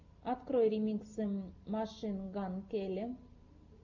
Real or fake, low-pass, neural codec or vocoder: real; 7.2 kHz; none